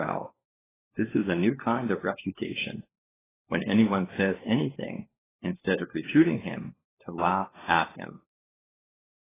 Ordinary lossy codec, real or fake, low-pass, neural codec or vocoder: AAC, 16 kbps; fake; 3.6 kHz; codec, 16 kHz, 4 kbps, FunCodec, trained on LibriTTS, 50 frames a second